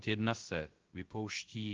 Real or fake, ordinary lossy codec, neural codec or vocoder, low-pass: fake; Opus, 16 kbps; codec, 16 kHz, 0.3 kbps, FocalCodec; 7.2 kHz